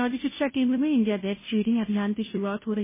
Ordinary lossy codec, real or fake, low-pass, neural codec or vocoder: MP3, 16 kbps; fake; 3.6 kHz; codec, 16 kHz, 0.5 kbps, FunCodec, trained on Chinese and English, 25 frames a second